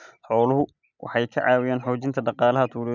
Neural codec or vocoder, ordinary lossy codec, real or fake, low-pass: none; none; real; 7.2 kHz